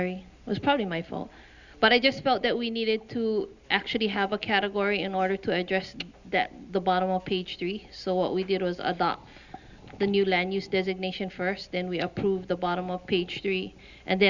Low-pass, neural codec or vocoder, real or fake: 7.2 kHz; none; real